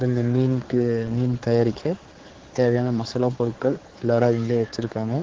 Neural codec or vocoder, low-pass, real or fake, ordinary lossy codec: codec, 16 kHz, 4 kbps, X-Codec, HuBERT features, trained on general audio; 7.2 kHz; fake; Opus, 16 kbps